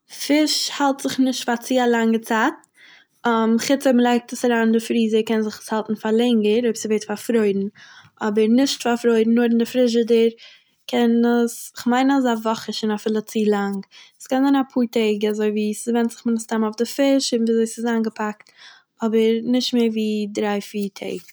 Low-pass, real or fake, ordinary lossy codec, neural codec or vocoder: none; real; none; none